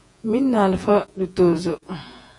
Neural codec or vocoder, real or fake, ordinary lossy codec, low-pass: vocoder, 48 kHz, 128 mel bands, Vocos; fake; AAC, 64 kbps; 10.8 kHz